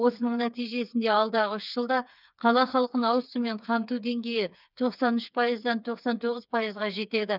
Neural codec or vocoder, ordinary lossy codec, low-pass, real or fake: codec, 16 kHz, 4 kbps, FreqCodec, smaller model; none; 5.4 kHz; fake